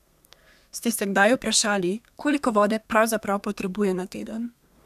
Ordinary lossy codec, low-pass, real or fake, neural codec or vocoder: none; 14.4 kHz; fake; codec, 32 kHz, 1.9 kbps, SNAC